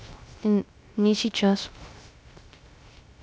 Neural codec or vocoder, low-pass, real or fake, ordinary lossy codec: codec, 16 kHz, 0.3 kbps, FocalCodec; none; fake; none